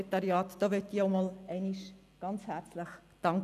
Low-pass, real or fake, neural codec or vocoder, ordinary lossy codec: 14.4 kHz; real; none; none